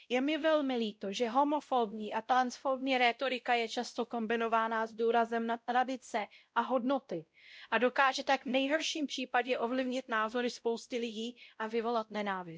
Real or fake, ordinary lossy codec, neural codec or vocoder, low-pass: fake; none; codec, 16 kHz, 0.5 kbps, X-Codec, WavLM features, trained on Multilingual LibriSpeech; none